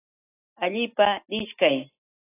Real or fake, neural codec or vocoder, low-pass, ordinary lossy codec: real; none; 3.6 kHz; AAC, 16 kbps